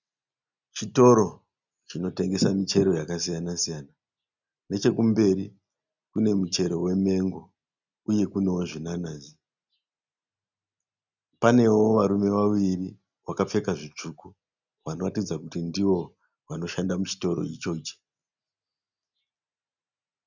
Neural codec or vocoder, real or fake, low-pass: none; real; 7.2 kHz